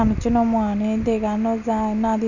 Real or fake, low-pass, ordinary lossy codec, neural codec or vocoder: real; 7.2 kHz; none; none